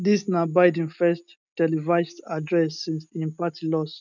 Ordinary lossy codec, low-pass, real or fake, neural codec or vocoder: none; 7.2 kHz; real; none